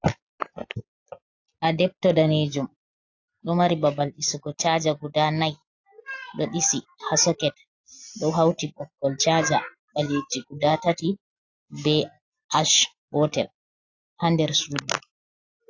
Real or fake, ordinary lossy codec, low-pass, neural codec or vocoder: real; AAC, 48 kbps; 7.2 kHz; none